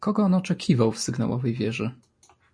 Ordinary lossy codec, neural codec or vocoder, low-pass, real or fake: MP3, 48 kbps; none; 9.9 kHz; real